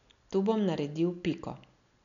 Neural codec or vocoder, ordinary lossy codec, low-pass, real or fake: none; none; 7.2 kHz; real